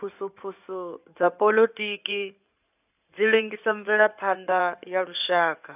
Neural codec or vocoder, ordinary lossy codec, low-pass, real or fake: codec, 16 kHz in and 24 kHz out, 2.2 kbps, FireRedTTS-2 codec; none; 3.6 kHz; fake